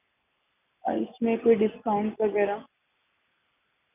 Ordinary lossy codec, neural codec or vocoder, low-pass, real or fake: none; none; 3.6 kHz; real